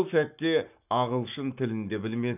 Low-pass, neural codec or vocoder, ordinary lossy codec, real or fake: 3.6 kHz; codec, 44.1 kHz, 7.8 kbps, Pupu-Codec; none; fake